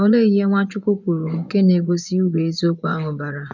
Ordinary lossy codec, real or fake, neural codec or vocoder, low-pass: none; fake; vocoder, 24 kHz, 100 mel bands, Vocos; 7.2 kHz